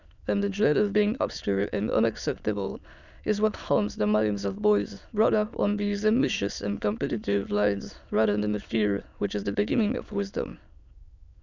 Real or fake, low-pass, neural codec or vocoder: fake; 7.2 kHz; autoencoder, 22.05 kHz, a latent of 192 numbers a frame, VITS, trained on many speakers